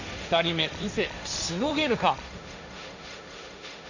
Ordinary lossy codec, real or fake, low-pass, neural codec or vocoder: none; fake; 7.2 kHz; codec, 16 kHz, 1.1 kbps, Voila-Tokenizer